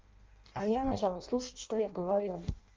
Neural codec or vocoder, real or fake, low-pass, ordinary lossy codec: codec, 16 kHz in and 24 kHz out, 0.6 kbps, FireRedTTS-2 codec; fake; 7.2 kHz; Opus, 32 kbps